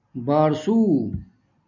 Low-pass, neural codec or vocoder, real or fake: 7.2 kHz; none; real